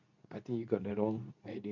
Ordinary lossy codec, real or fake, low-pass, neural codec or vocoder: none; fake; 7.2 kHz; codec, 24 kHz, 0.9 kbps, WavTokenizer, medium speech release version 2